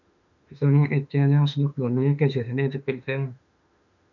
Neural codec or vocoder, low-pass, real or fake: autoencoder, 48 kHz, 32 numbers a frame, DAC-VAE, trained on Japanese speech; 7.2 kHz; fake